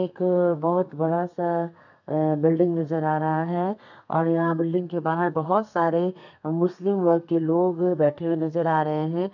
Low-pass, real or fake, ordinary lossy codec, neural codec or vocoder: 7.2 kHz; fake; none; codec, 32 kHz, 1.9 kbps, SNAC